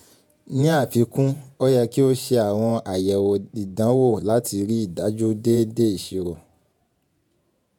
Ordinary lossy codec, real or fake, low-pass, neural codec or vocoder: none; fake; 19.8 kHz; vocoder, 48 kHz, 128 mel bands, Vocos